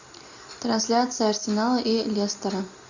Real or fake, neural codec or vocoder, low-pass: real; none; 7.2 kHz